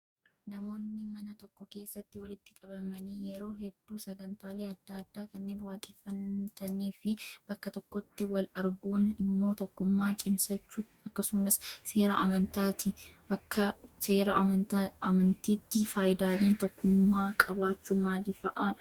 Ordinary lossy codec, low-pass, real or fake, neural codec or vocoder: Opus, 64 kbps; 19.8 kHz; fake; codec, 44.1 kHz, 2.6 kbps, DAC